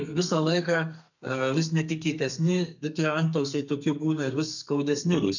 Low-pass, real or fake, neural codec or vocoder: 7.2 kHz; fake; codec, 32 kHz, 1.9 kbps, SNAC